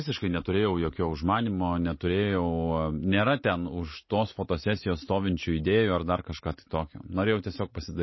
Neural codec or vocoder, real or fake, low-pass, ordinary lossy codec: none; real; 7.2 kHz; MP3, 24 kbps